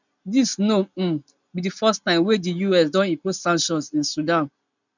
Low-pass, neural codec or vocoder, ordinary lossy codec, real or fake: 7.2 kHz; none; none; real